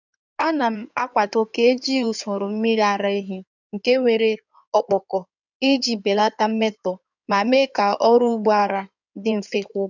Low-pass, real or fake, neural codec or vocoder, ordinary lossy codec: 7.2 kHz; fake; codec, 16 kHz in and 24 kHz out, 2.2 kbps, FireRedTTS-2 codec; none